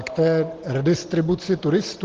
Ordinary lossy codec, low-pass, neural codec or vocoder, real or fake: Opus, 16 kbps; 7.2 kHz; none; real